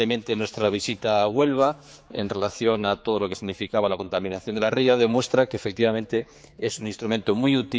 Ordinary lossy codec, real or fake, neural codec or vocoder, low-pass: none; fake; codec, 16 kHz, 4 kbps, X-Codec, HuBERT features, trained on general audio; none